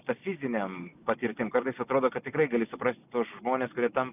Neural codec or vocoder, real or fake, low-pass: none; real; 3.6 kHz